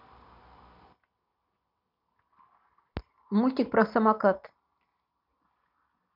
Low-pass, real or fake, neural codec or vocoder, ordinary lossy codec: 5.4 kHz; real; none; none